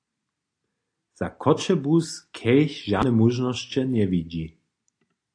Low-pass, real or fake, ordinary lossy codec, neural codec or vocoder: 9.9 kHz; real; AAC, 48 kbps; none